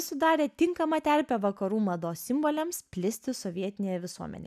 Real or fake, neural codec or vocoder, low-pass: real; none; 14.4 kHz